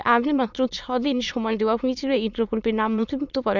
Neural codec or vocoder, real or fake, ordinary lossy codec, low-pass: autoencoder, 22.05 kHz, a latent of 192 numbers a frame, VITS, trained on many speakers; fake; none; 7.2 kHz